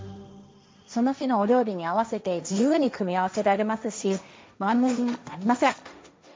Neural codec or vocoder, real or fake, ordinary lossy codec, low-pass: codec, 16 kHz, 1.1 kbps, Voila-Tokenizer; fake; none; none